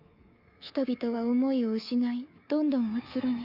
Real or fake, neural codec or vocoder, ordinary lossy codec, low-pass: fake; codec, 24 kHz, 3.1 kbps, DualCodec; Opus, 64 kbps; 5.4 kHz